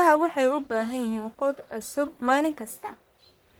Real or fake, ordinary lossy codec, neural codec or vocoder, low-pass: fake; none; codec, 44.1 kHz, 1.7 kbps, Pupu-Codec; none